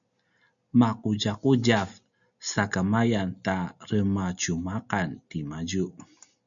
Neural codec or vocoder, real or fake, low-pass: none; real; 7.2 kHz